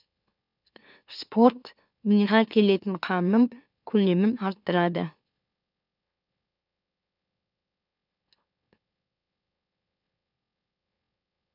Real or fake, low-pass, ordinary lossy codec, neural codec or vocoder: fake; 5.4 kHz; none; autoencoder, 44.1 kHz, a latent of 192 numbers a frame, MeloTTS